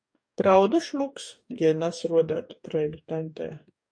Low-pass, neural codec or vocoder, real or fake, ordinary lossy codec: 9.9 kHz; codec, 44.1 kHz, 2.6 kbps, DAC; fake; MP3, 96 kbps